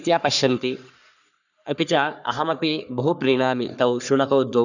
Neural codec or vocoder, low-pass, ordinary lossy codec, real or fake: codec, 44.1 kHz, 3.4 kbps, Pupu-Codec; 7.2 kHz; none; fake